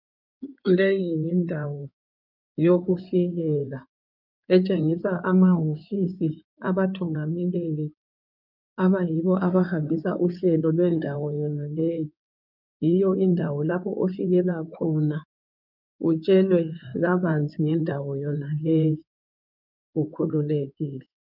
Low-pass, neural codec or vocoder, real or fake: 5.4 kHz; codec, 16 kHz in and 24 kHz out, 2.2 kbps, FireRedTTS-2 codec; fake